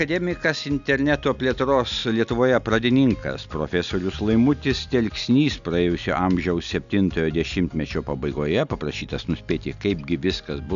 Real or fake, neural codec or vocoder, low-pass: real; none; 7.2 kHz